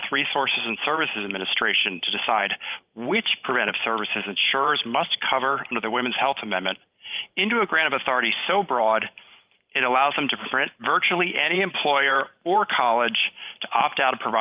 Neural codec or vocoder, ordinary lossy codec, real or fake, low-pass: vocoder, 44.1 kHz, 128 mel bands every 512 samples, BigVGAN v2; Opus, 24 kbps; fake; 3.6 kHz